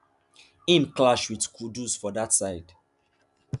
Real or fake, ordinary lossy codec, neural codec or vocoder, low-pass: real; none; none; 10.8 kHz